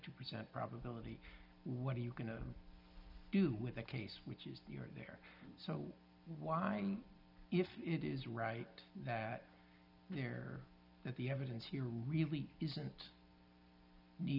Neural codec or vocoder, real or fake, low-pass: none; real; 5.4 kHz